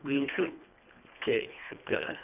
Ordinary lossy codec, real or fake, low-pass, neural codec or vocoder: none; fake; 3.6 kHz; codec, 24 kHz, 1.5 kbps, HILCodec